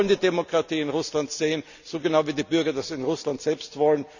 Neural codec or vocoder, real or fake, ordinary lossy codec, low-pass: none; real; none; 7.2 kHz